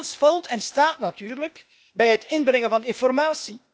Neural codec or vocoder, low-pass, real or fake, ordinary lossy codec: codec, 16 kHz, 0.8 kbps, ZipCodec; none; fake; none